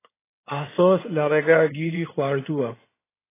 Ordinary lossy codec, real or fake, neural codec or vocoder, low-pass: AAC, 16 kbps; fake; codec, 16 kHz in and 24 kHz out, 2.2 kbps, FireRedTTS-2 codec; 3.6 kHz